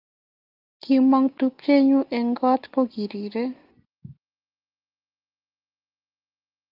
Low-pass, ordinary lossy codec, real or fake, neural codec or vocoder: 5.4 kHz; Opus, 24 kbps; real; none